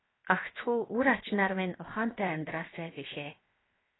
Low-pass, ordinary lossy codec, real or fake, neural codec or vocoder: 7.2 kHz; AAC, 16 kbps; fake; codec, 16 kHz, 0.7 kbps, FocalCodec